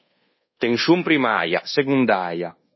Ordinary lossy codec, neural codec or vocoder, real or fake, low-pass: MP3, 24 kbps; codec, 24 kHz, 1.2 kbps, DualCodec; fake; 7.2 kHz